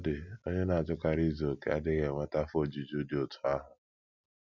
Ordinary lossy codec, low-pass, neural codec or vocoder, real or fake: none; 7.2 kHz; none; real